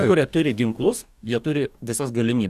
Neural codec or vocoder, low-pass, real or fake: codec, 44.1 kHz, 2.6 kbps, DAC; 14.4 kHz; fake